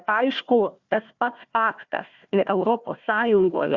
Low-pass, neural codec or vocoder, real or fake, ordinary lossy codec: 7.2 kHz; codec, 16 kHz, 1 kbps, FunCodec, trained on Chinese and English, 50 frames a second; fake; Opus, 64 kbps